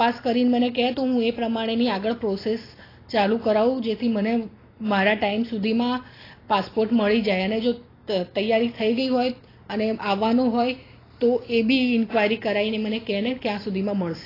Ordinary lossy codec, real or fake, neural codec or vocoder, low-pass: AAC, 24 kbps; real; none; 5.4 kHz